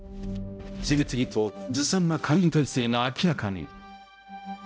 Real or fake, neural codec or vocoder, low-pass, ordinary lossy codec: fake; codec, 16 kHz, 0.5 kbps, X-Codec, HuBERT features, trained on balanced general audio; none; none